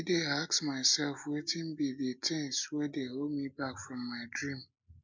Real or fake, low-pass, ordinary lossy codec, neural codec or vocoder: real; 7.2 kHz; MP3, 64 kbps; none